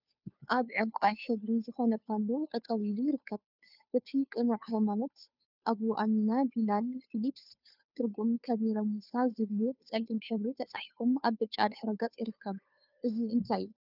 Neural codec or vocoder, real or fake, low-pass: codec, 16 kHz, 2 kbps, FunCodec, trained on Chinese and English, 25 frames a second; fake; 5.4 kHz